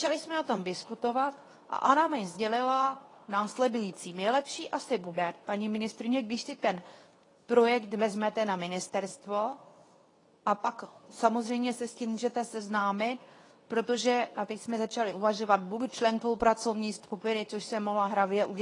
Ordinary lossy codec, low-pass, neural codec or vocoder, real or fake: AAC, 32 kbps; 10.8 kHz; codec, 24 kHz, 0.9 kbps, WavTokenizer, medium speech release version 2; fake